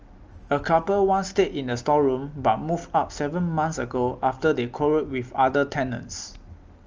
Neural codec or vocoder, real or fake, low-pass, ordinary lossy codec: none; real; 7.2 kHz; Opus, 24 kbps